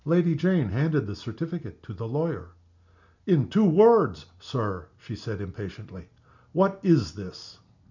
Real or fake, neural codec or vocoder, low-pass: real; none; 7.2 kHz